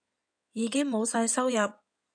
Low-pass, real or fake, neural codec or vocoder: 9.9 kHz; fake; codec, 16 kHz in and 24 kHz out, 2.2 kbps, FireRedTTS-2 codec